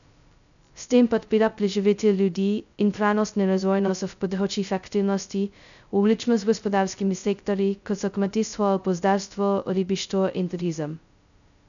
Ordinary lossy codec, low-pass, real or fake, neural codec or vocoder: none; 7.2 kHz; fake; codec, 16 kHz, 0.2 kbps, FocalCodec